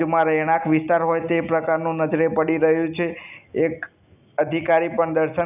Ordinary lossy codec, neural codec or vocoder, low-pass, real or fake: none; none; 3.6 kHz; real